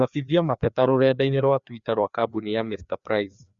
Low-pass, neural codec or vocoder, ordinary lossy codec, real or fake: 7.2 kHz; codec, 16 kHz, 2 kbps, FreqCodec, larger model; Opus, 64 kbps; fake